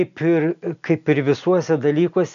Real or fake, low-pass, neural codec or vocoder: real; 7.2 kHz; none